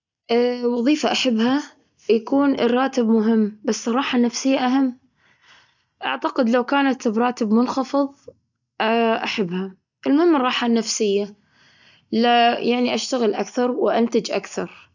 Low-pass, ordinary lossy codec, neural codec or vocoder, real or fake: 7.2 kHz; none; none; real